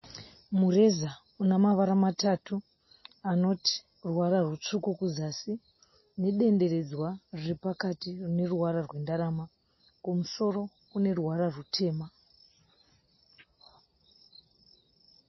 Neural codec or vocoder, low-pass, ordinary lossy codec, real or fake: none; 7.2 kHz; MP3, 24 kbps; real